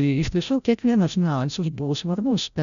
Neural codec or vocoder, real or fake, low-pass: codec, 16 kHz, 0.5 kbps, FreqCodec, larger model; fake; 7.2 kHz